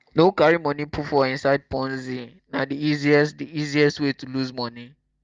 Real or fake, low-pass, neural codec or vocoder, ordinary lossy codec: real; 7.2 kHz; none; Opus, 32 kbps